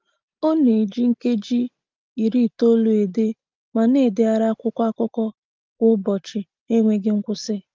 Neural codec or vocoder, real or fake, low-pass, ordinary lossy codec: none; real; 7.2 kHz; Opus, 32 kbps